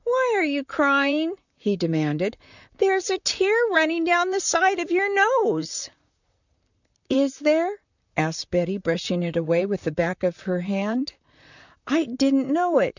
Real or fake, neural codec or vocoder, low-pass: fake; vocoder, 44.1 kHz, 128 mel bands, Pupu-Vocoder; 7.2 kHz